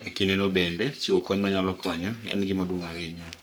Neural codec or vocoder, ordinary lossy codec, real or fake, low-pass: codec, 44.1 kHz, 3.4 kbps, Pupu-Codec; none; fake; none